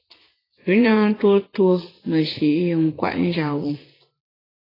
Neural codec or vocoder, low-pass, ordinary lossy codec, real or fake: vocoder, 44.1 kHz, 80 mel bands, Vocos; 5.4 kHz; AAC, 24 kbps; fake